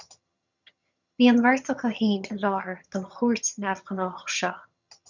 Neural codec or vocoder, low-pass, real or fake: vocoder, 22.05 kHz, 80 mel bands, HiFi-GAN; 7.2 kHz; fake